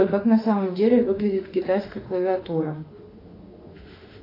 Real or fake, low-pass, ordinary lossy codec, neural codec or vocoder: fake; 5.4 kHz; AAC, 32 kbps; autoencoder, 48 kHz, 32 numbers a frame, DAC-VAE, trained on Japanese speech